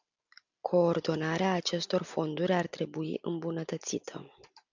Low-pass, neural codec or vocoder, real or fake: 7.2 kHz; none; real